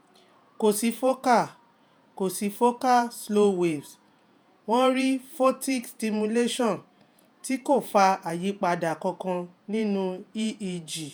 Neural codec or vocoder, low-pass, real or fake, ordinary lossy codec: vocoder, 48 kHz, 128 mel bands, Vocos; none; fake; none